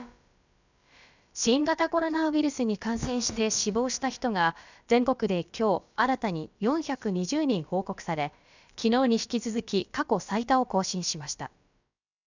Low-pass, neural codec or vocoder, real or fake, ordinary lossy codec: 7.2 kHz; codec, 16 kHz, about 1 kbps, DyCAST, with the encoder's durations; fake; none